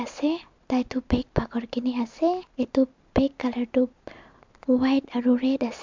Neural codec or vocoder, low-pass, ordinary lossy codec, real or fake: none; 7.2 kHz; MP3, 64 kbps; real